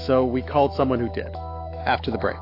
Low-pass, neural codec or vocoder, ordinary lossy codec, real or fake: 5.4 kHz; autoencoder, 48 kHz, 128 numbers a frame, DAC-VAE, trained on Japanese speech; AAC, 32 kbps; fake